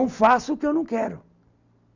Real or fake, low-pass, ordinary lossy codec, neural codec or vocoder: real; 7.2 kHz; none; none